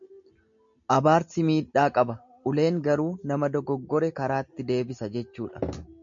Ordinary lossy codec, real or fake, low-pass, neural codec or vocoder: MP3, 64 kbps; real; 7.2 kHz; none